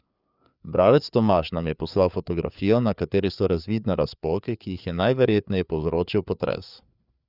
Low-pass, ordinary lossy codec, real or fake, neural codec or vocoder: 5.4 kHz; none; fake; codec, 16 kHz, 4 kbps, FreqCodec, larger model